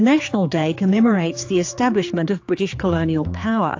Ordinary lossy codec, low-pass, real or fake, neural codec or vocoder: AAC, 48 kbps; 7.2 kHz; fake; codec, 16 kHz, 4 kbps, X-Codec, HuBERT features, trained on general audio